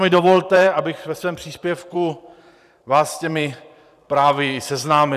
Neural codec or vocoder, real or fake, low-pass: vocoder, 44.1 kHz, 128 mel bands every 512 samples, BigVGAN v2; fake; 14.4 kHz